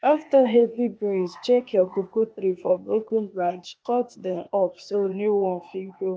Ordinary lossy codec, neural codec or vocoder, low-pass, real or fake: none; codec, 16 kHz, 0.8 kbps, ZipCodec; none; fake